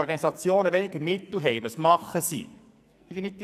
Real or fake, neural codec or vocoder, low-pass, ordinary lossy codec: fake; codec, 44.1 kHz, 2.6 kbps, SNAC; 14.4 kHz; none